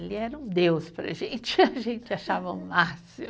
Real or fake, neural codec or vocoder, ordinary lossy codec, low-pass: real; none; none; none